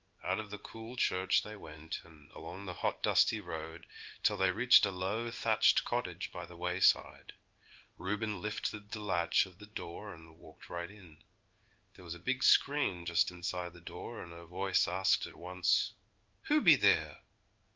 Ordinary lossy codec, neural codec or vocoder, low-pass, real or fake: Opus, 32 kbps; codec, 16 kHz in and 24 kHz out, 1 kbps, XY-Tokenizer; 7.2 kHz; fake